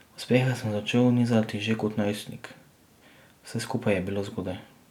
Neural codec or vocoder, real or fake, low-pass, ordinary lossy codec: none; real; 19.8 kHz; none